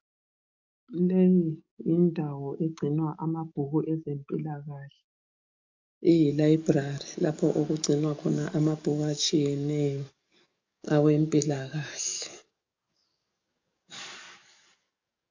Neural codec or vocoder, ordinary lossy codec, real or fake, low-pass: codec, 44.1 kHz, 7.8 kbps, DAC; MP3, 48 kbps; fake; 7.2 kHz